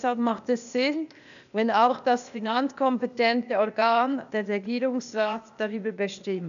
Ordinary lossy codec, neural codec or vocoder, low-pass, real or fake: none; codec, 16 kHz, 0.8 kbps, ZipCodec; 7.2 kHz; fake